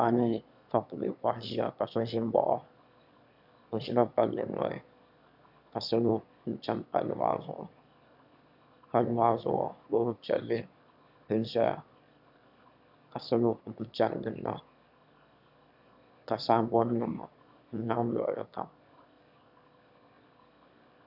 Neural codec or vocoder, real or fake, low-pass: autoencoder, 22.05 kHz, a latent of 192 numbers a frame, VITS, trained on one speaker; fake; 5.4 kHz